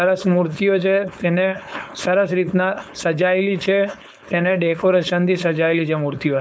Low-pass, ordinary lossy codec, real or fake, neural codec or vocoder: none; none; fake; codec, 16 kHz, 4.8 kbps, FACodec